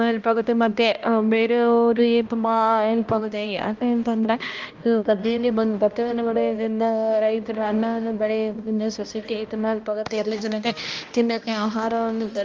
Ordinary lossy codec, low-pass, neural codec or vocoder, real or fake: Opus, 24 kbps; 7.2 kHz; codec, 16 kHz, 1 kbps, X-Codec, HuBERT features, trained on balanced general audio; fake